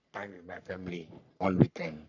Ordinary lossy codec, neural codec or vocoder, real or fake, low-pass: none; codec, 44.1 kHz, 3.4 kbps, Pupu-Codec; fake; 7.2 kHz